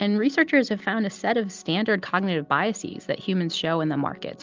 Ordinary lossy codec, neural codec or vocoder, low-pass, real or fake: Opus, 32 kbps; none; 7.2 kHz; real